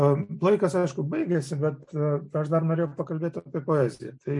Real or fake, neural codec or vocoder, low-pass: real; none; 10.8 kHz